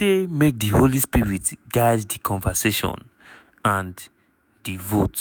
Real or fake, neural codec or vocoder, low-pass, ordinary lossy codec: fake; autoencoder, 48 kHz, 128 numbers a frame, DAC-VAE, trained on Japanese speech; none; none